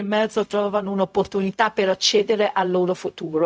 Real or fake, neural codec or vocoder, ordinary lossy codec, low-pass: fake; codec, 16 kHz, 0.4 kbps, LongCat-Audio-Codec; none; none